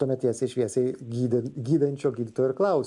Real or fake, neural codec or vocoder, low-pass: real; none; 10.8 kHz